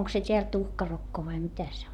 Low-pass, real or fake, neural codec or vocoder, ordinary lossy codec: 19.8 kHz; fake; vocoder, 44.1 kHz, 128 mel bands, Pupu-Vocoder; none